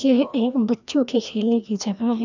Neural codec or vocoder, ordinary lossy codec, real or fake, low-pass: codec, 16 kHz, 1 kbps, FreqCodec, larger model; none; fake; 7.2 kHz